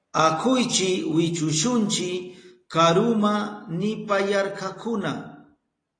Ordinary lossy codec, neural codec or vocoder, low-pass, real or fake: AAC, 32 kbps; none; 9.9 kHz; real